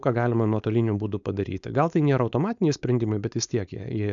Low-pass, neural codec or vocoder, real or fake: 7.2 kHz; codec, 16 kHz, 4.8 kbps, FACodec; fake